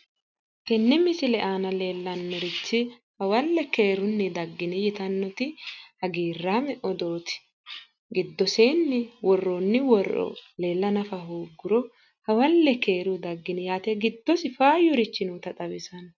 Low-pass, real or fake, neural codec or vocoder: 7.2 kHz; real; none